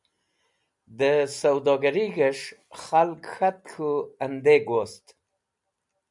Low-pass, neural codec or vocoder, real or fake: 10.8 kHz; none; real